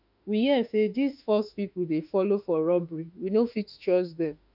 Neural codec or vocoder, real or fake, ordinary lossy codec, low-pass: autoencoder, 48 kHz, 32 numbers a frame, DAC-VAE, trained on Japanese speech; fake; none; 5.4 kHz